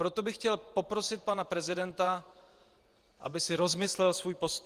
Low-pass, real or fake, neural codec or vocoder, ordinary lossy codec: 14.4 kHz; fake; vocoder, 48 kHz, 128 mel bands, Vocos; Opus, 24 kbps